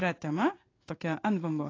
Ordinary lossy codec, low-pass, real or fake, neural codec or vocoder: AAC, 32 kbps; 7.2 kHz; fake; codec, 16 kHz in and 24 kHz out, 1 kbps, XY-Tokenizer